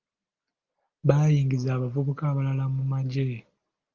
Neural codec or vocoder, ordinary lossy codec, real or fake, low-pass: none; Opus, 32 kbps; real; 7.2 kHz